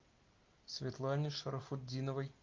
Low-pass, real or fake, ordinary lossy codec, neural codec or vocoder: 7.2 kHz; real; Opus, 32 kbps; none